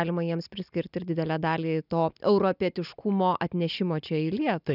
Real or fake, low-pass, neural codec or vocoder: real; 5.4 kHz; none